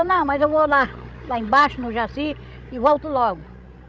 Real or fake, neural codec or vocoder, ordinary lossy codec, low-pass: fake; codec, 16 kHz, 16 kbps, FreqCodec, larger model; none; none